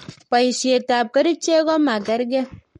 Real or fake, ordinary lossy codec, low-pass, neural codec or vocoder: fake; MP3, 48 kbps; 19.8 kHz; codec, 44.1 kHz, 7.8 kbps, Pupu-Codec